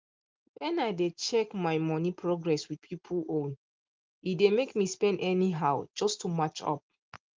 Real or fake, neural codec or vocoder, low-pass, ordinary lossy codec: real; none; 7.2 kHz; Opus, 24 kbps